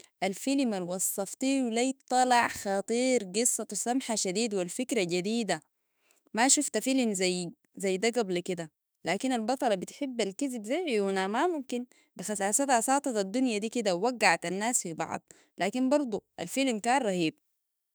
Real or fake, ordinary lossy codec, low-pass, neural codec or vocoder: fake; none; none; autoencoder, 48 kHz, 32 numbers a frame, DAC-VAE, trained on Japanese speech